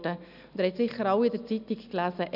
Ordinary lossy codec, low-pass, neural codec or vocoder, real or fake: none; 5.4 kHz; none; real